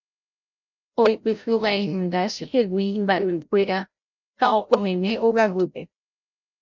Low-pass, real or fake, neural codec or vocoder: 7.2 kHz; fake; codec, 16 kHz, 0.5 kbps, FreqCodec, larger model